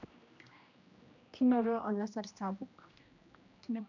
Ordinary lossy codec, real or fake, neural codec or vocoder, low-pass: none; fake; codec, 16 kHz, 1 kbps, X-Codec, HuBERT features, trained on general audio; 7.2 kHz